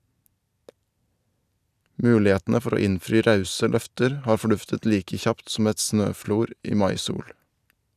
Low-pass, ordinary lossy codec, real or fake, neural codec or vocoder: 14.4 kHz; none; real; none